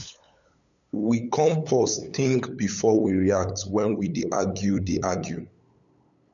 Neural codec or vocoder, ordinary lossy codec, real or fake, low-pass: codec, 16 kHz, 8 kbps, FunCodec, trained on LibriTTS, 25 frames a second; none; fake; 7.2 kHz